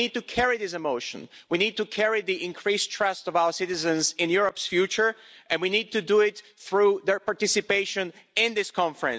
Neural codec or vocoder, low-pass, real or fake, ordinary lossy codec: none; none; real; none